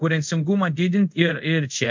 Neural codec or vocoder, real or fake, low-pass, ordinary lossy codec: codec, 24 kHz, 0.5 kbps, DualCodec; fake; 7.2 kHz; MP3, 64 kbps